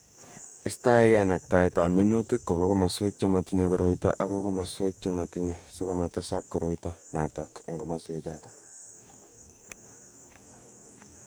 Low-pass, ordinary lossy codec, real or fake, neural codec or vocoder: none; none; fake; codec, 44.1 kHz, 2.6 kbps, DAC